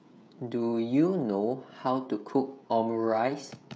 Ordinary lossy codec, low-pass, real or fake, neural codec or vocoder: none; none; fake; codec, 16 kHz, 16 kbps, FreqCodec, smaller model